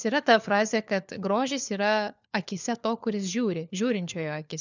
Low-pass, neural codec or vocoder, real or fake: 7.2 kHz; codec, 16 kHz, 4 kbps, FunCodec, trained on LibriTTS, 50 frames a second; fake